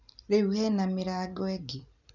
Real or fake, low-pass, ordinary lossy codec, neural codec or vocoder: real; 7.2 kHz; none; none